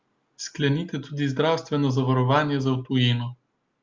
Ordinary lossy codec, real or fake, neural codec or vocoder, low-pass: Opus, 32 kbps; real; none; 7.2 kHz